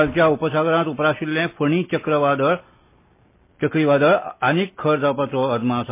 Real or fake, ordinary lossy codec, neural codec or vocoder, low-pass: real; MP3, 24 kbps; none; 3.6 kHz